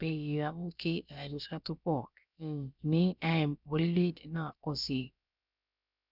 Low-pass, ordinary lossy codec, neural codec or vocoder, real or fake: 5.4 kHz; none; codec, 16 kHz, about 1 kbps, DyCAST, with the encoder's durations; fake